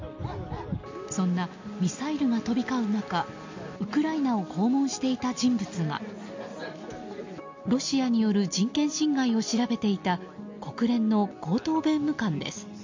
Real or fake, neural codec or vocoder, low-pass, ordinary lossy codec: real; none; 7.2 kHz; MP3, 48 kbps